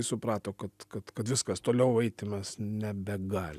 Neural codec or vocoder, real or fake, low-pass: none; real; 14.4 kHz